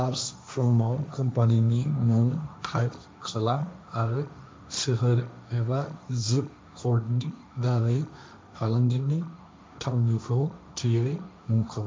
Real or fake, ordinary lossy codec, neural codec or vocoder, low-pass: fake; none; codec, 16 kHz, 1.1 kbps, Voila-Tokenizer; none